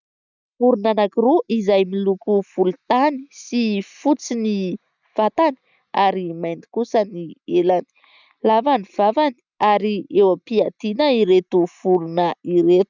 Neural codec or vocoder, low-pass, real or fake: none; 7.2 kHz; real